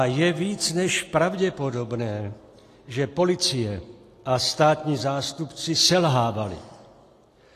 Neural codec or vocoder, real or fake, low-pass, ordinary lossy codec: none; real; 14.4 kHz; AAC, 48 kbps